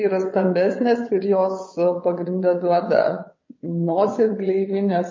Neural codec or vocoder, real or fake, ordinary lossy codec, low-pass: vocoder, 22.05 kHz, 80 mel bands, WaveNeXt; fake; MP3, 32 kbps; 7.2 kHz